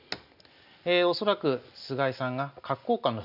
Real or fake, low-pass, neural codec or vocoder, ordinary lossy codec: real; 5.4 kHz; none; none